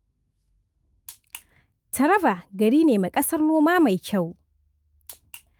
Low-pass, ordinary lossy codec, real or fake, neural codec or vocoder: 19.8 kHz; Opus, 32 kbps; fake; autoencoder, 48 kHz, 128 numbers a frame, DAC-VAE, trained on Japanese speech